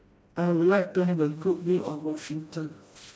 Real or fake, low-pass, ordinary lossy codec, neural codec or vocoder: fake; none; none; codec, 16 kHz, 1 kbps, FreqCodec, smaller model